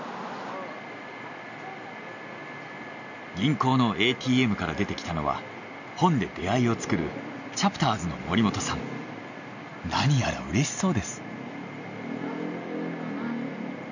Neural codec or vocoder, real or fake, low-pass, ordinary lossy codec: none; real; 7.2 kHz; none